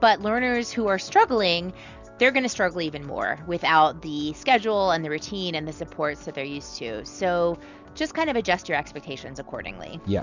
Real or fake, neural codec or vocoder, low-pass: real; none; 7.2 kHz